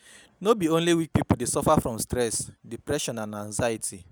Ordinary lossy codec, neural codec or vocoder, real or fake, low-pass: none; none; real; none